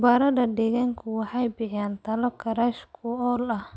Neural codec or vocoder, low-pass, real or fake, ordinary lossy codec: none; none; real; none